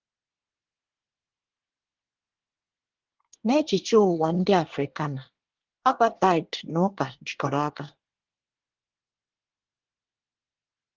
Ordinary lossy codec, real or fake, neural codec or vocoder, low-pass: Opus, 16 kbps; fake; codec, 24 kHz, 1 kbps, SNAC; 7.2 kHz